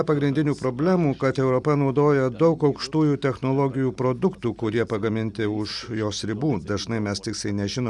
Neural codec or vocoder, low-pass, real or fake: none; 10.8 kHz; real